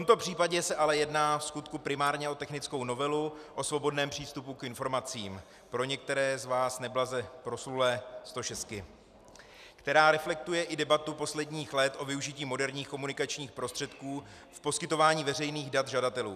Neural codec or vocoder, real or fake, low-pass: none; real; 14.4 kHz